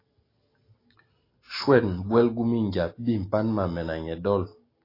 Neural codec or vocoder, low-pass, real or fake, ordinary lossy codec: none; 5.4 kHz; real; AAC, 24 kbps